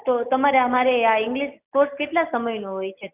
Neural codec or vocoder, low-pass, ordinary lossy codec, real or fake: none; 3.6 kHz; none; real